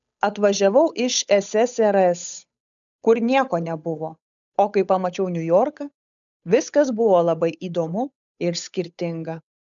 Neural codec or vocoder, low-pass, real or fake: codec, 16 kHz, 8 kbps, FunCodec, trained on Chinese and English, 25 frames a second; 7.2 kHz; fake